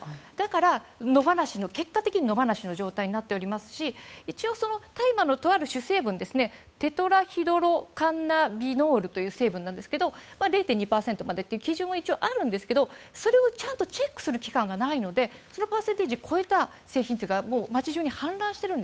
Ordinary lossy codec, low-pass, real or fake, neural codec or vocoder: none; none; fake; codec, 16 kHz, 8 kbps, FunCodec, trained on Chinese and English, 25 frames a second